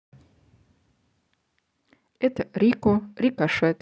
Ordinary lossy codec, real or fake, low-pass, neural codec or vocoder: none; real; none; none